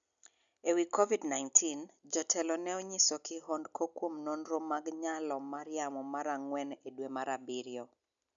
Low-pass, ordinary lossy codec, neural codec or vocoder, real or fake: 7.2 kHz; MP3, 96 kbps; none; real